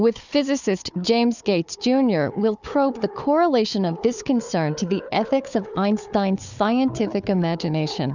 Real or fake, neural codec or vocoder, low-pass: fake; codec, 16 kHz, 4 kbps, FunCodec, trained on Chinese and English, 50 frames a second; 7.2 kHz